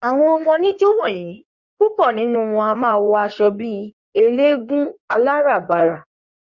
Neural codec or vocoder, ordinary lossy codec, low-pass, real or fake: codec, 16 kHz in and 24 kHz out, 1.1 kbps, FireRedTTS-2 codec; Opus, 64 kbps; 7.2 kHz; fake